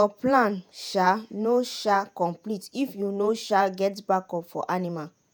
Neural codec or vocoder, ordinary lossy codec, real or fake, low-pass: vocoder, 48 kHz, 128 mel bands, Vocos; none; fake; none